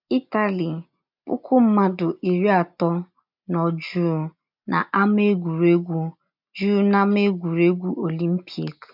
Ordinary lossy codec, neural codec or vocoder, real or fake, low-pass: MP3, 48 kbps; none; real; 5.4 kHz